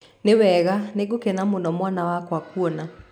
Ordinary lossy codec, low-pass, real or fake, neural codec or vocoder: none; 19.8 kHz; real; none